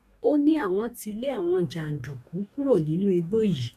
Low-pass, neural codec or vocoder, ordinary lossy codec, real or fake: 14.4 kHz; codec, 44.1 kHz, 2.6 kbps, DAC; none; fake